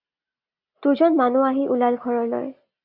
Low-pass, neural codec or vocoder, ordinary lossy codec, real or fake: 5.4 kHz; none; AAC, 48 kbps; real